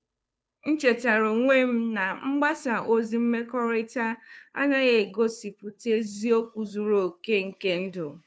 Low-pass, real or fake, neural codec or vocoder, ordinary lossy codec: none; fake; codec, 16 kHz, 2 kbps, FunCodec, trained on Chinese and English, 25 frames a second; none